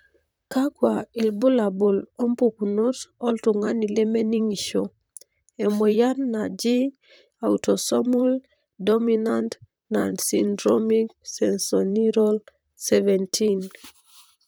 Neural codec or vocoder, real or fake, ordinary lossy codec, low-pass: vocoder, 44.1 kHz, 128 mel bands, Pupu-Vocoder; fake; none; none